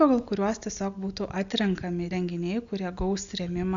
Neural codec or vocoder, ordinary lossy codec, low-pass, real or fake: none; AAC, 64 kbps; 7.2 kHz; real